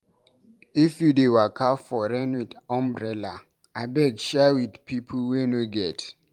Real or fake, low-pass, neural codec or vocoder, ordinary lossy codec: fake; 19.8 kHz; vocoder, 44.1 kHz, 128 mel bands every 512 samples, BigVGAN v2; Opus, 32 kbps